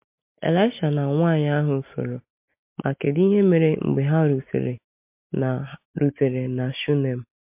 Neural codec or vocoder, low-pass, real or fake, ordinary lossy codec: none; 3.6 kHz; real; MP3, 32 kbps